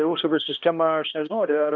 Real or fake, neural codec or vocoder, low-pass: fake; codec, 16 kHz, 1 kbps, X-Codec, HuBERT features, trained on balanced general audio; 7.2 kHz